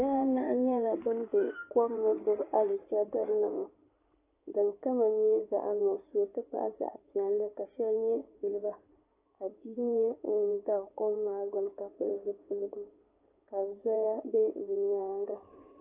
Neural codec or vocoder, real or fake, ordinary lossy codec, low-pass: codec, 16 kHz in and 24 kHz out, 2.2 kbps, FireRedTTS-2 codec; fake; AAC, 24 kbps; 3.6 kHz